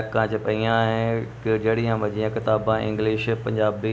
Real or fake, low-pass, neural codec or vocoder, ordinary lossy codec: real; none; none; none